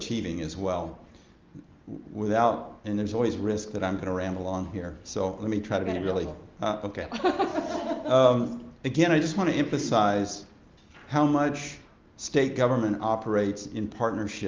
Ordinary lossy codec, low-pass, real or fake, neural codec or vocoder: Opus, 32 kbps; 7.2 kHz; real; none